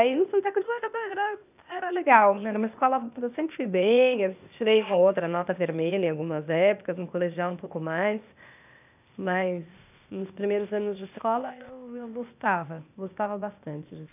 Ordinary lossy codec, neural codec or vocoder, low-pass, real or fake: none; codec, 16 kHz, 0.8 kbps, ZipCodec; 3.6 kHz; fake